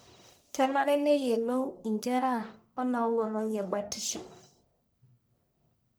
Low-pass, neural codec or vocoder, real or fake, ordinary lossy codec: none; codec, 44.1 kHz, 1.7 kbps, Pupu-Codec; fake; none